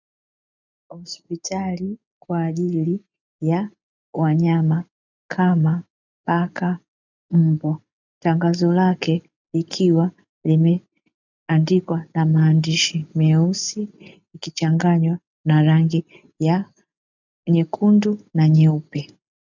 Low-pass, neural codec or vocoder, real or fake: 7.2 kHz; none; real